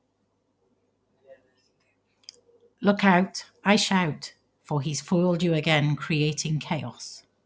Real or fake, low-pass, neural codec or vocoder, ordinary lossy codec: real; none; none; none